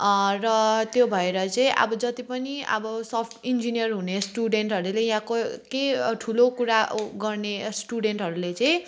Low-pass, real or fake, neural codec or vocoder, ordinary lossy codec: none; real; none; none